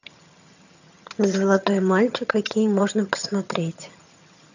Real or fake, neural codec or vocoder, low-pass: fake; vocoder, 22.05 kHz, 80 mel bands, HiFi-GAN; 7.2 kHz